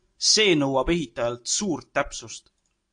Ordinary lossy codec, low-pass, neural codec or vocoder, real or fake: AAC, 48 kbps; 9.9 kHz; none; real